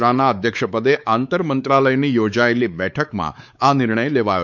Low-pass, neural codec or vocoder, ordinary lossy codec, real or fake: 7.2 kHz; codec, 16 kHz, 4 kbps, X-Codec, WavLM features, trained on Multilingual LibriSpeech; none; fake